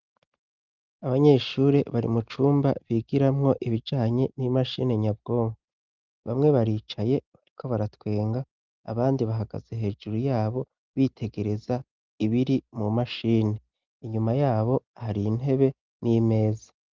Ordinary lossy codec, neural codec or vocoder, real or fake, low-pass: Opus, 24 kbps; none; real; 7.2 kHz